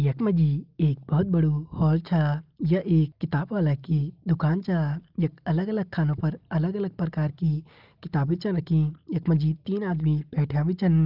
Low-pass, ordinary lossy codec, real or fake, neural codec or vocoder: 5.4 kHz; Opus, 32 kbps; real; none